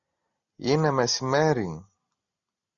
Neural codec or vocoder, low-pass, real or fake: none; 7.2 kHz; real